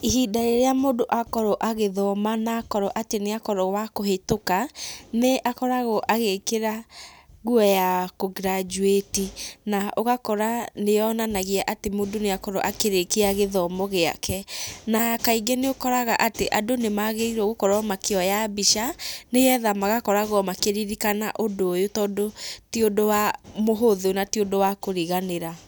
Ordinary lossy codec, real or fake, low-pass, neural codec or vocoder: none; real; none; none